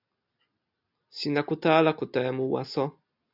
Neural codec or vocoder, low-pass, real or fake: none; 5.4 kHz; real